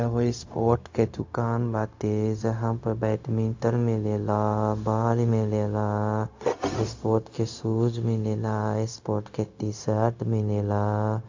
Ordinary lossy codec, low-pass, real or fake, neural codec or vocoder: AAC, 48 kbps; 7.2 kHz; fake; codec, 16 kHz, 0.4 kbps, LongCat-Audio-Codec